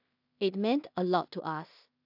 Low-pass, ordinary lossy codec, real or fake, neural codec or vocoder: 5.4 kHz; AAC, 48 kbps; fake; codec, 16 kHz in and 24 kHz out, 0.4 kbps, LongCat-Audio-Codec, two codebook decoder